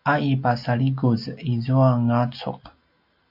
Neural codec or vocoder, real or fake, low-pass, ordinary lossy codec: none; real; 5.4 kHz; MP3, 48 kbps